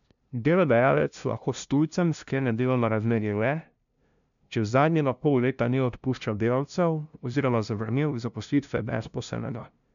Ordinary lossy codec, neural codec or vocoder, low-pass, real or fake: none; codec, 16 kHz, 0.5 kbps, FunCodec, trained on LibriTTS, 25 frames a second; 7.2 kHz; fake